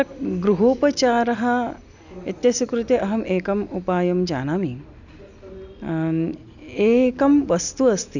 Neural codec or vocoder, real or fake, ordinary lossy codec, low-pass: none; real; none; 7.2 kHz